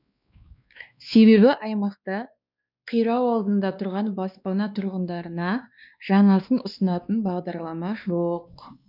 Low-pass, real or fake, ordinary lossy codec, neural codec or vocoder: 5.4 kHz; fake; none; codec, 16 kHz, 2 kbps, X-Codec, WavLM features, trained on Multilingual LibriSpeech